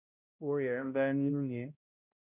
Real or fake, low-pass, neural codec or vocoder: fake; 3.6 kHz; codec, 16 kHz, 0.5 kbps, X-Codec, HuBERT features, trained on balanced general audio